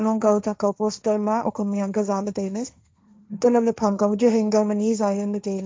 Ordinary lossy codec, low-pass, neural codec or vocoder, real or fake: none; none; codec, 16 kHz, 1.1 kbps, Voila-Tokenizer; fake